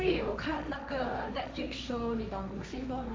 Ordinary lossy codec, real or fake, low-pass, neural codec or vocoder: none; fake; none; codec, 16 kHz, 1.1 kbps, Voila-Tokenizer